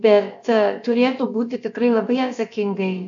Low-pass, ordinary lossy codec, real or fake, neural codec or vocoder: 7.2 kHz; AAC, 64 kbps; fake; codec, 16 kHz, about 1 kbps, DyCAST, with the encoder's durations